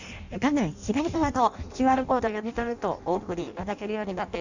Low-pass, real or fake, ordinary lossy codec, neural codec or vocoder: 7.2 kHz; fake; none; codec, 16 kHz in and 24 kHz out, 0.6 kbps, FireRedTTS-2 codec